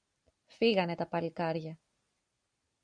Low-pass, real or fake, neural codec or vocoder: 9.9 kHz; real; none